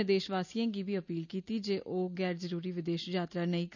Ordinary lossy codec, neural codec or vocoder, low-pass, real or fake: none; none; 7.2 kHz; real